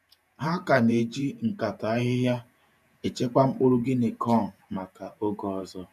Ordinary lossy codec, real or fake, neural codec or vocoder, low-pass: none; fake; vocoder, 48 kHz, 128 mel bands, Vocos; 14.4 kHz